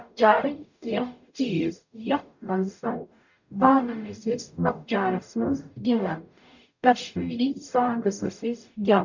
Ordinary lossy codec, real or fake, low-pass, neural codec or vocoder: none; fake; 7.2 kHz; codec, 44.1 kHz, 0.9 kbps, DAC